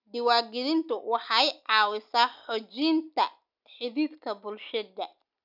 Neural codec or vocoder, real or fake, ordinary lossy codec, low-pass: none; real; none; 5.4 kHz